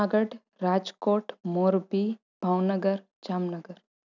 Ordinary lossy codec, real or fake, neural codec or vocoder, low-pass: none; real; none; 7.2 kHz